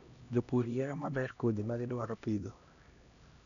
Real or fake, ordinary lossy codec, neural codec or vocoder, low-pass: fake; none; codec, 16 kHz, 1 kbps, X-Codec, HuBERT features, trained on LibriSpeech; 7.2 kHz